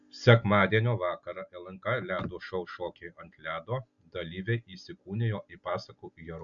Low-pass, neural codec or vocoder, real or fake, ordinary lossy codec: 7.2 kHz; none; real; MP3, 96 kbps